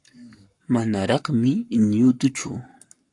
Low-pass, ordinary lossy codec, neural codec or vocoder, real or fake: 10.8 kHz; AAC, 64 kbps; codec, 44.1 kHz, 7.8 kbps, Pupu-Codec; fake